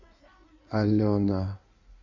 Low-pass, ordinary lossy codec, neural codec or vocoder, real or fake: 7.2 kHz; AAC, 48 kbps; codec, 16 kHz in and 24 kHz out, 1.1 kbps, FireRedTTS-2 codec; fake